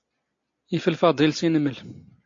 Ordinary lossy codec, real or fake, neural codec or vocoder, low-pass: AAC, 48 kbps; real; none; 7.2 kHz